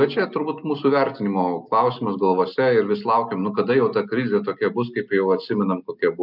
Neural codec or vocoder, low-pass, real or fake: none; 5.4 kHz; real